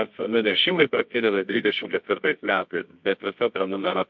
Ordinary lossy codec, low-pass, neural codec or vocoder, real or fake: MP3, 48 kbps; 7.2 kHz; codec, 24 kHz, 0.9 kbps, WavTokenizer, medium music audio release; fake